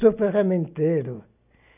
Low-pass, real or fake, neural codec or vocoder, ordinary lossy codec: 3.6 kHz; real; none; none